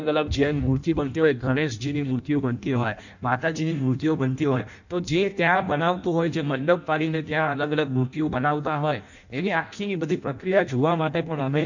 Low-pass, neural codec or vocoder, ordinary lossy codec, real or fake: 7.2 kHz; codec, 16 kHz in and 24 kHz out, 0.6 kbps, FireRedTTS-2 codec; none; fake